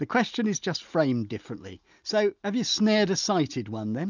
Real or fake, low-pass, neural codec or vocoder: real; 7.2 kHz; none